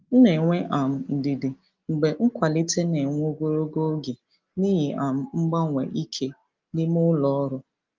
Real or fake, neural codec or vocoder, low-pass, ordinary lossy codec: real; none; 7.2 kHz; Opus, 32 kbps